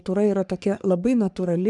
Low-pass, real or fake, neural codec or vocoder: 10.8 kHz; fake; codec, 44.1 kHz, 3.4 kbps, Pupu-Codec